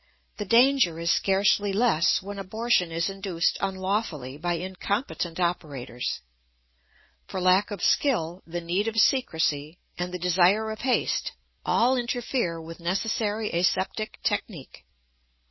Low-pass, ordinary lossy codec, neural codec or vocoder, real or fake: 7.2 kHz; MP3, 24 kbps; none; real